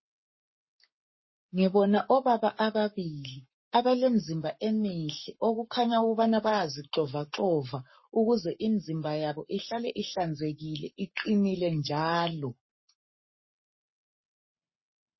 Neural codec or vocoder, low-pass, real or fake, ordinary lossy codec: codec, 44.1 kHz, 7.8 kbps, Pupu-Codec; 7.2 kHz; fake; MP3, 24 kbps